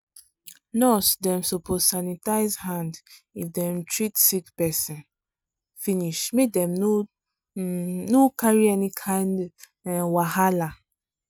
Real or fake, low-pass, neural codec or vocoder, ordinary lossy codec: real; none; none; none